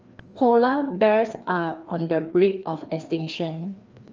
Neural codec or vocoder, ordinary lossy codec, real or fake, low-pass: codec, 16 kHz, 2 kbps, FreqCodec, larger model; Opus, 24 kbps; fake; 7.2 kHz